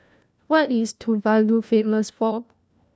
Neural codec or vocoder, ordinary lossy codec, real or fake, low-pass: codec, 16 kHz, 1 kbps, FunCodec, trained on LibriTTS, 50 frames a second; none; fake; none